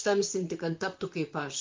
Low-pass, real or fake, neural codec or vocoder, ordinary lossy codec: 7.2 kHz; fake; vocoder, 44.1 kHz, 128 mel bands, Pupu-Vocoder; Opus, 24 kbps